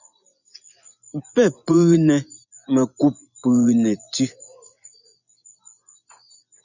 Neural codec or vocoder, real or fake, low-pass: vocoder, 24 kHz, 100 mel bands, Vocos; fake; 7.2 kHz